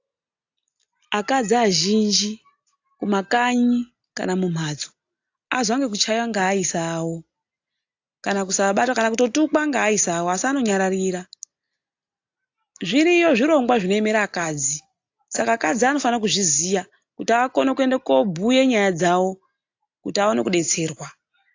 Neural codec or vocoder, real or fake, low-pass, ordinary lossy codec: none; real; 7.2 kHz; AAC, 48 kbps